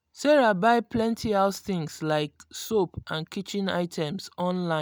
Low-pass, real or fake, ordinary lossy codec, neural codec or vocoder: none; real; none; none